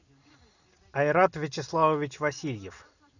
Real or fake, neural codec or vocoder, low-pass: real; none; 7.2 kHz